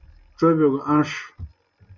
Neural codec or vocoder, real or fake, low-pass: none; real; 7.2 kHz